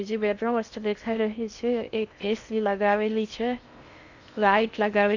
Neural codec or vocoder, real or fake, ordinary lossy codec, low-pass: codec, 16 kHz in and 24 kHz out, 0.6 kbps, FocalCodec, streaming, 4096 codes; fake; none; 7.2 kHz